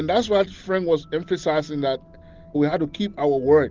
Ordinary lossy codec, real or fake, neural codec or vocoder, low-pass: Opus, 32 kbps; real; none; 7.2 kHz